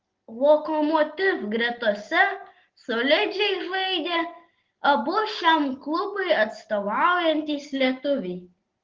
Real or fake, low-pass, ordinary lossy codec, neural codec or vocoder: real; 7.2 kHz; Opus, 16 kbps; none